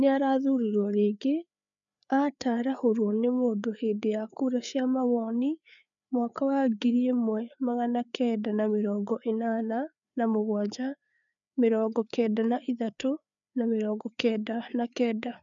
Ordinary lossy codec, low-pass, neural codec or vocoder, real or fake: none; 7.2 kHz; codec, 16 kHz, 4 kbps, FreqCodec, larger model; fake